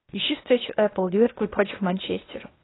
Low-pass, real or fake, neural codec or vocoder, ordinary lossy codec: 7.2 kHz; fake; codec, 16 kHz, 0.8 kbps, ZipCodec; AAC, 16 kbps